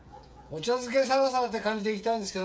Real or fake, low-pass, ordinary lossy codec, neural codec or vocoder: fake; none; none; codec, 16 kHz, 8 kbps, FreqCodec, smaller model